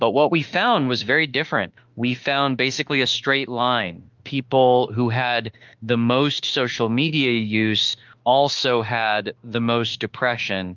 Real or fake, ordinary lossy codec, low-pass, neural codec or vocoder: fake; Opus, 32 kbps; 7.2 kHz; autoencoder, 48 kHz, 32 numbers a frame, DAC-VAE, trained on Japanese speech